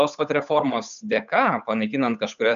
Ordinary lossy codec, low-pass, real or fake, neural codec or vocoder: AAC, 96 kbps; 7.2 kHz; fake; codec, 16 kHz, 8 kbps, FunCodec, trained on Chinese and English, 25 frames a second